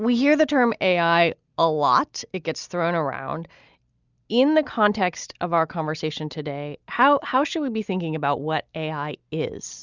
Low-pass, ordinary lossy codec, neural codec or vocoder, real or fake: 7.2 kHz; Opus, 64 kbps; none; real